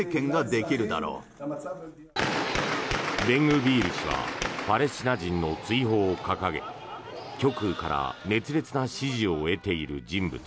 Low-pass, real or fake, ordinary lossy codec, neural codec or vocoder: none; real; none; none